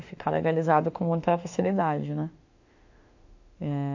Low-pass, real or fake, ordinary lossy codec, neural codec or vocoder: 7.2 kHz; fake; AAC, 48 kbps; autoencoder, 48 kHz, 32 numbers a frame, DAC-VAE, trained on Japanese speech